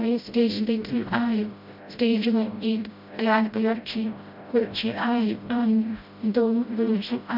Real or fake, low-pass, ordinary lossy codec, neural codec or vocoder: fake; 5.4 kHz; MP3, 48 kbps; codec, 16 kHz, 0.5 kbps, FreqCodec, smaller model